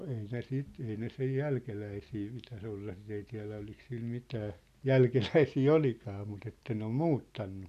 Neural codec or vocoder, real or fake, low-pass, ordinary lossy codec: none; real; 14.4 kHz; none